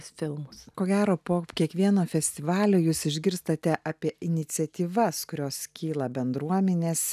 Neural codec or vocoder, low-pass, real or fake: none; 14.4 kHz; real